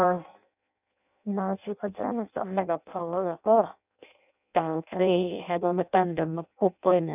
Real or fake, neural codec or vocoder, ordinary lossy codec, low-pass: fake; codec, 16 kHz in and 24 kHz out, 0.6 kbps, FireRedTTS-2 codec; none; 3.6 kHz